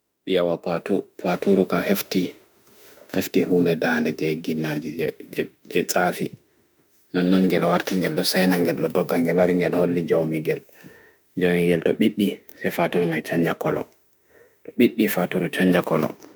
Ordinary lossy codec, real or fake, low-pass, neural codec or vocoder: none; fake; none; autoencoder, 48 kHz, 32 numbers a frame, DAC-VAE, trained on Japanese speech